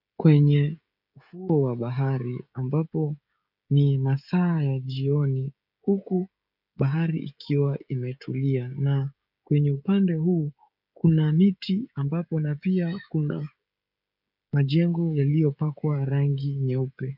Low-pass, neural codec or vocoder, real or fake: 5.4 kHz; codec, 16 kHz, 16 kbps, FreqCodec, smaller model; fake